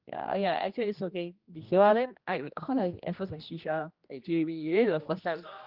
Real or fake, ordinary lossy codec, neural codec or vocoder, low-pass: fake; Opus, 24 kbps; codec, 16 kHz, 1 kbps, X-Codec, HuBERT features, trained on general audio; 5.4 kHz